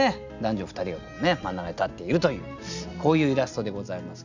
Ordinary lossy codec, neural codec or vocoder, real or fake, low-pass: none; none; real; 7.2 kHz